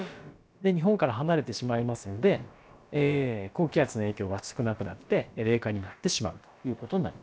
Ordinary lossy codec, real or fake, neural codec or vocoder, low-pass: none; fake; codec, 16 kHz, about 1 kbps, DyCAST, with the encoder's durations; none